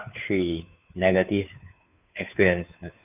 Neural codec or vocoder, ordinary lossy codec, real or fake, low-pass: codec, 16 kHz, 2 kbps, FunCodec, trained on Chinese and English, 25 frames a second; none; fake; 3.6 kHz